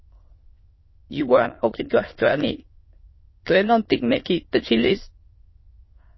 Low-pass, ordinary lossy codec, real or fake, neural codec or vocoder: 7.2 kHz; MP3, 24 kbps; fake; autoencoder, 22.05 kHz, a latent of 192 numbers a frame, VITS, trained on many speakers